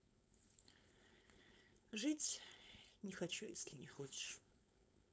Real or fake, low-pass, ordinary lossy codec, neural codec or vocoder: fake; none; none; codec, 16 kHz, 4.8 kbps, FACodec